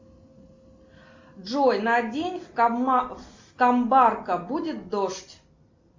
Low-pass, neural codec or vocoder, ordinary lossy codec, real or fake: 7.2 kHz; none; MP3, 64 kbps; real